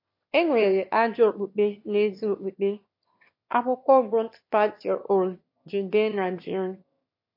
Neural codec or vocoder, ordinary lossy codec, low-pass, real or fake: autoencoder, 22.05 kHz, a latent of 192 numbers a frame, VITS, trained on one speaker; MP3, 32 kbps; 5.4 kHz; fake